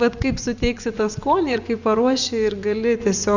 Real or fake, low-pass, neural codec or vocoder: fake; 7.2 kHz; vocoder, 24 kHz, 100 mel bands, Vocos